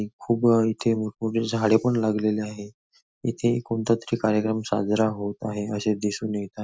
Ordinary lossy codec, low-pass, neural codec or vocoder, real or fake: none; none; none; real